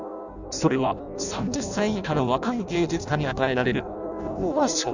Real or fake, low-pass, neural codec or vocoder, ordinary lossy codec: fake; 7.2 kHz; codec, 16 kHz in and 24 kHz out, 0.6 kbps, FireRedTTS-2 codec; none